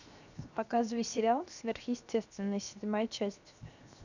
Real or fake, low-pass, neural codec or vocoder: fake; 7.2 kHz; codec, 16 kHz, 0.7 kbps, FocalCodec